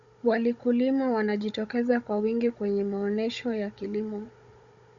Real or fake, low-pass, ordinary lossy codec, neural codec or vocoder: fake; 7.2 kHz; Opus, 64 kbps; codec, 16 kHz, 16 kbps, FunCodec, trained on Chinese and English, 50 frames a second